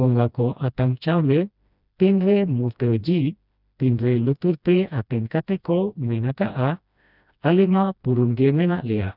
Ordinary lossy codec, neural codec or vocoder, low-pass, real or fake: none; codec, 16 kHz, 1 kbps, FreqCodec, smaller model; 5.4 kHz; fake